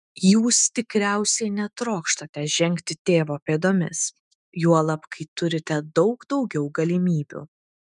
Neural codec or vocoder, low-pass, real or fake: autoencoder, 48 kHz, 128 numbers a frame, DAC-VAE, trained on Japanese speech; 10.8 kHz; fake